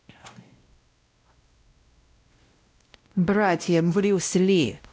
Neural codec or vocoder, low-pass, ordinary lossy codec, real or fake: codec, 16 kHz, 0.5 kbps, X-Codec, WavLM features, trained on Multilingual LibriSpeech; none; none; fake